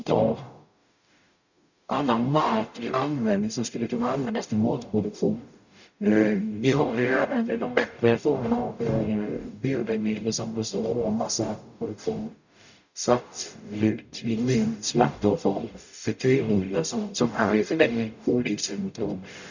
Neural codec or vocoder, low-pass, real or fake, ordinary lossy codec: codec, 44.1 kHz, 0.9 kbps, DAC; 7.2 kHz; fake; none